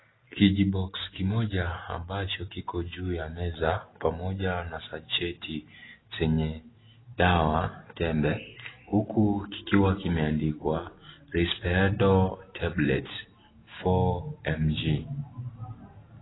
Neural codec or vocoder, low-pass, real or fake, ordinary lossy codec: none; 7.2 kHz; real; AAC, 16 kbps